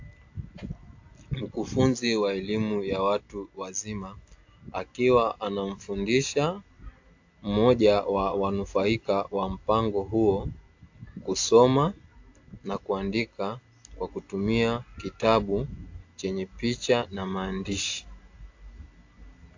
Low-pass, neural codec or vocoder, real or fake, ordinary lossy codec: 7.2 kHz; none; real; AAC, 48 kbps